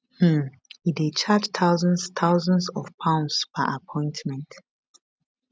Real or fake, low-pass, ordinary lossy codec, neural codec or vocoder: real; none; none; none